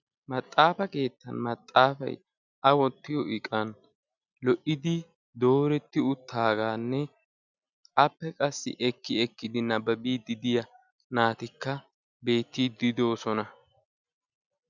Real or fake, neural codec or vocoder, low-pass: real; none; 7.2 kHz